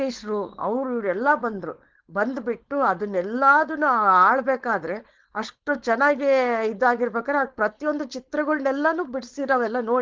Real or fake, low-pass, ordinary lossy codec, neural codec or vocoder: fake; 7.2 kHz; Opus, 16 kbps; codec, 16 kHz, 4.8 kbps, FACodec